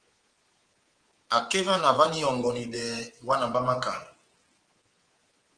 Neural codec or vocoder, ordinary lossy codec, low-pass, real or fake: codec, 24 kHz, 3.1 kbps, DualCodec; Opus, 16 kbps; 9.9 kHz; fake